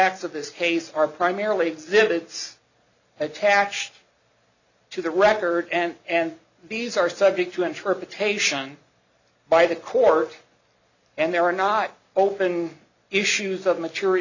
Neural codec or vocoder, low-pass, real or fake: none; 7.2 kHz; real